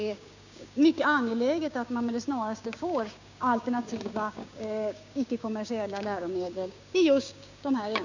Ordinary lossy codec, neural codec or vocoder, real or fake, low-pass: none; codec, 16 kHz, 6 kbps, DAC; fake; 7.2 kHz